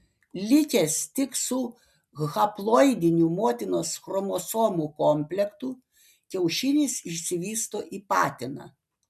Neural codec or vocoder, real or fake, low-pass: none; real; 14.4 kHz